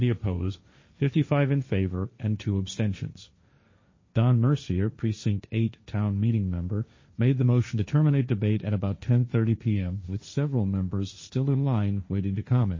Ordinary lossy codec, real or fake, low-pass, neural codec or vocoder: MP3, 32 kbps; fake; 7.2 kHz; codec, 16 kHz, 1.1 kbps, Voila-Tokenizer